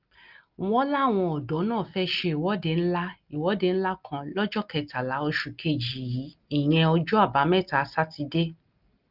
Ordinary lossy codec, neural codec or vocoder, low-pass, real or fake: Opus, 32 kbps; none; 5.4 kHz; real